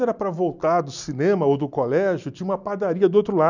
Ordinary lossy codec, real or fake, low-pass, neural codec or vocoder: none; real; 7.2 kHz; none